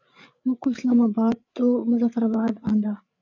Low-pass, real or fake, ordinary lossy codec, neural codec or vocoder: 7.2 kHz; fake; MP3, 48 kbps; codec, 16 kHz, 8 kbps, FreqCodec, larger model